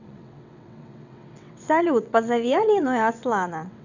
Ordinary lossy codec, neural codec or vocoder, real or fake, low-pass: none; vocoder, 22.05 kHz, 80 mel bands, WaveNeXt; fake; 7.2 kHz